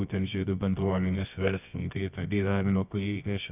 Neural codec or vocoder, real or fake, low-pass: codec, 24 kHz, 0.9 kbps, WavTokenizer, medium music audio release; fake; 3.6 kHz